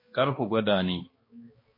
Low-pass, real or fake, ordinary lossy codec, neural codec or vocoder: 5.4 kHz; fake; MP3, 24 kbps; codec, 16 kHz, 2 kbps, X-Codec, HuBERT features, trained on balanced general audio